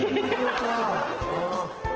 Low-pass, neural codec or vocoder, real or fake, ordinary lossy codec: 7.2 kHz; none; real; Opus, 16 kbps